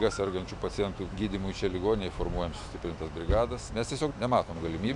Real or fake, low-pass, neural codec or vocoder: fake; 10.8 kHz; autoencoder, 48 kHz, 128 numbers a frame, DAC-VAE, trained on Japanese speech